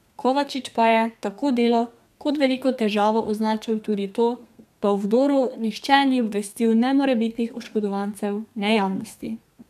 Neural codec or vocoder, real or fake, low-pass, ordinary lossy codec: codec, 32 kHz, 1.9 kbps, SNAC; fake; 14.4 kHz; none